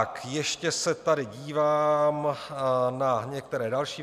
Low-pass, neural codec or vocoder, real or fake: 14.4 kHz; none; real